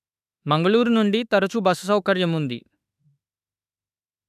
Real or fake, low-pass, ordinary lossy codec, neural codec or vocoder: fake; 14.4 kHz; none; autoencoder, 48 kHz, 32 numbers a frame, DAC-VAE, trained on Japanese speech